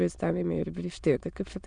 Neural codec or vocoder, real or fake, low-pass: autoencoder, 22.05 kHz, a latent of 192 numbers a frame, VITS, trained on many speakers; fake; 9.9 kHz